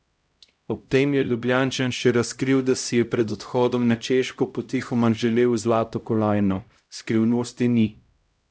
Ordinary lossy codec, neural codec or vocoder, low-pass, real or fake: none; codec, 16 kHz, 0.5 kbps, X-Codec, HuBERT features, trained on LibriSpeech; none; fake